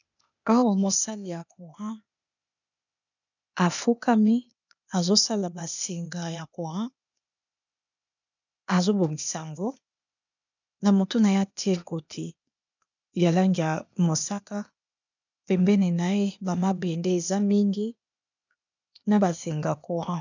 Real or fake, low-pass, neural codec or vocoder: fake; 7.2 kHz; codec, 16 kHz, 0.8 kbps, ZipCodec